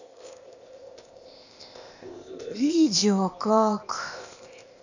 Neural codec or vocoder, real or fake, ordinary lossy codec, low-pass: codec, 16 kHz, 0.8 kbps, ZipCodec; fake; none; 7.2 kHz